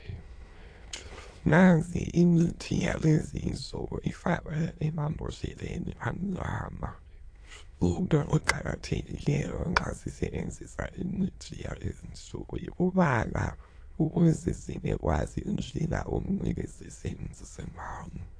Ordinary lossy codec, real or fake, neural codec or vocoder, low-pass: AAC, 48 kbps; fake; autoencoder, 22.05 kHz, a latent of 192 numbers a frame, VITS, trained on many speakers; 9.9 kHz